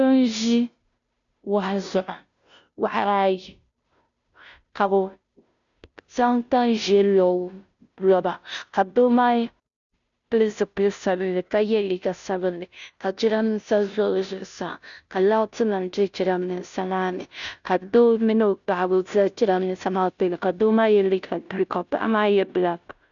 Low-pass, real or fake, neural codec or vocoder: 7.2 kHz; fake; codec, 16 kHz, 0.5 kbps, FunCodec, trained on Chinese and English, 25 frames a second